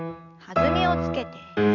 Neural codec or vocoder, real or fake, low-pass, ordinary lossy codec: none; real; 7.2 kHz; none